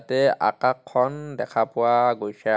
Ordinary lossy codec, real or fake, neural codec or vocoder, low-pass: none; real; none; none